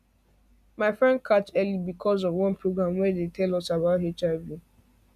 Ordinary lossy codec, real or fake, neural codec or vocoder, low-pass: none; real; none; 14.4 kHz